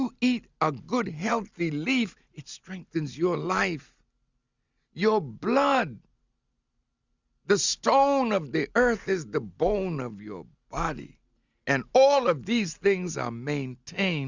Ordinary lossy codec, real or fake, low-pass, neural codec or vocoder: Opus, 64 kbps; real; 7.2 kHz; none